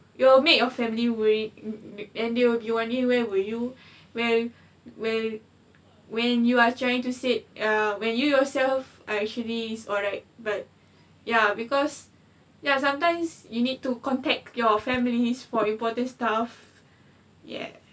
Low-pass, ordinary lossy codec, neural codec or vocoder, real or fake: none; none; none; real